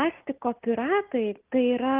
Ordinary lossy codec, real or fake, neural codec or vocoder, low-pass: Opus, 32 kbps; real; none; 3.6 kHz